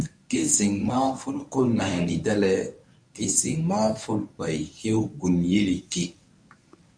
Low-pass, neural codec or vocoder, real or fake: 9.9 kHz; codec, 24 kHz, 0.9 kbps, WavTokenizer, medium speech release version 1; fake